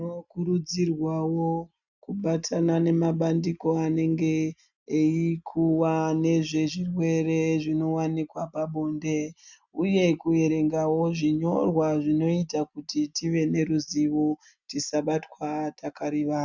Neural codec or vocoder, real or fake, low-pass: none; real; 7.2 kHz